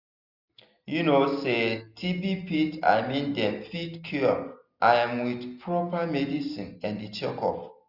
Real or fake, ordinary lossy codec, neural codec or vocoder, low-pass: real; none; none; 5.4 kHz